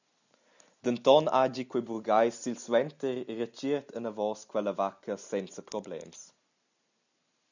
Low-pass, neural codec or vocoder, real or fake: 7.2 kHz; none; real